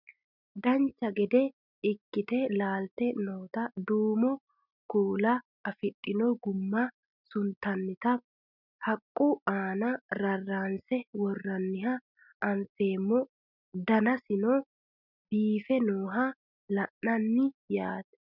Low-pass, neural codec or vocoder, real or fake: 5.4 kHz; none; real